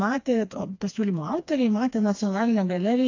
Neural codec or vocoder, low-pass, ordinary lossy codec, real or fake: codec, 16 kHz, 2 kbps, FreqCodec, smaller model; 7.2 kHz; AAC, 48 kbps; fake